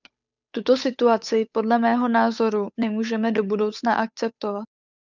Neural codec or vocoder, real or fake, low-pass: codec, 16 kHz, 8 kbps, FunCodec, trained on Chinese and English, 25 frames a second; fake; 7.2 kHz